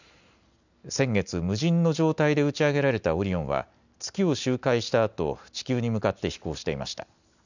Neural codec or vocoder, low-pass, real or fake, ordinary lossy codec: none; 7.2 kHz; real; none